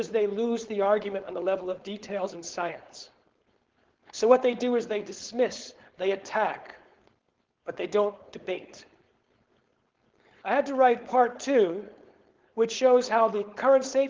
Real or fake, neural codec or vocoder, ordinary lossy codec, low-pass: fake; codec, 16 kHz, 4.8 kbps, FACodec; Opus, 16 kbps; 7.2 kHz